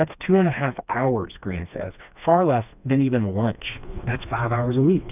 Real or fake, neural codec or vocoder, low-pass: fake; codec, 16 kHz, 2 kbps, FreqCodec, smaller model; 3.6 kHz